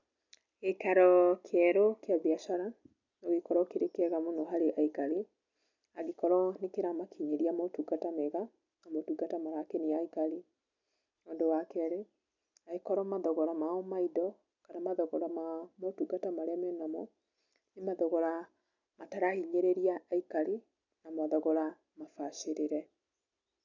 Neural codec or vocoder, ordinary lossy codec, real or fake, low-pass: none; none; real; 7.2 kHz